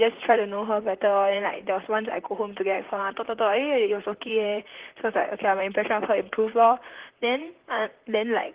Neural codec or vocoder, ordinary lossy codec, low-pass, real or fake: vocoder, 44.1 kHz, 128 mel bands, Pupu-Vocoder; Opus, 16 kbps; 3.6 kHz; fake